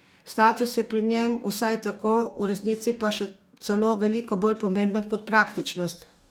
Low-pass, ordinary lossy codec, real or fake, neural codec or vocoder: 19.8 kHz; none; fake; codec, 44.1 kHz, 2.6 kbps, DAC